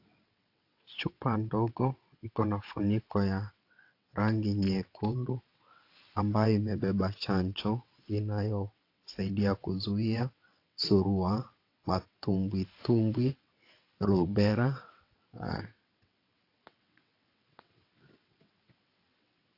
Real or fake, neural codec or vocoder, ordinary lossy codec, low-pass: fake; vocoder, 22.05 kHz, 80 mel bands, WaveNeXt; AAC, 32 kbps; 5.4 kHz